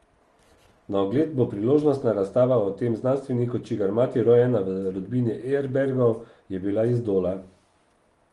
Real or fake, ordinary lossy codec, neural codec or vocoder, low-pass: real; Opus, 24 kbps; none; 10.8 kHz